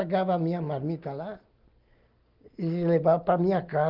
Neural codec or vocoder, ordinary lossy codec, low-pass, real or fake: none; Opus, 32 kbps; 5.4 kHz; real